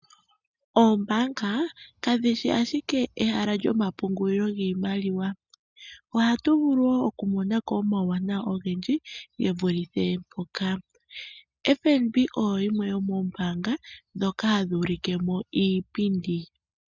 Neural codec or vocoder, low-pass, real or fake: none; 7.2 kHz; real